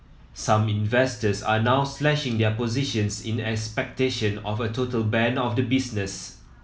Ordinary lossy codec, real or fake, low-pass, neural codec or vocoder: none; real; none; none